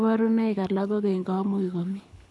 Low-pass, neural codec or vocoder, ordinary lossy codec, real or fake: none; codec, 24 kHz, 6 kbps, HILCodec; none; fake